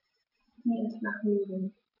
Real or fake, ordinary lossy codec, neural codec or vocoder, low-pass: real; none; none; 5.4 kHz